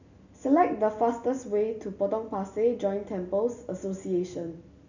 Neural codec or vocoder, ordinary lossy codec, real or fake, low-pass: none; none; real; 7.2 kHz